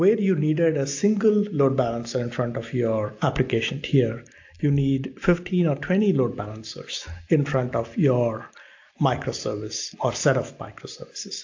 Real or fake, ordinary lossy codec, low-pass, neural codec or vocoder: real; AAC, 48 kbps; 7.2 kHz; none